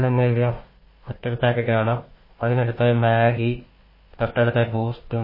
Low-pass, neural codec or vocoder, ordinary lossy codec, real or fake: 5.4 kHz; codec, 16 kHz, 1 kbps, FunCodec, trained on Chinese and English, 50 frames a second; MP3, 24 kbps; fake